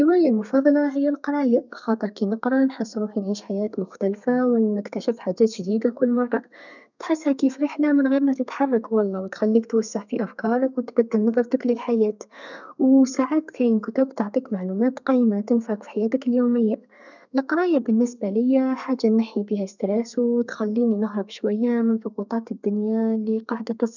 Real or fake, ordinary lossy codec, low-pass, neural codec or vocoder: fake; none; 7.2 kHz; codec, 32 kHz, 1.9 kbps, SNAC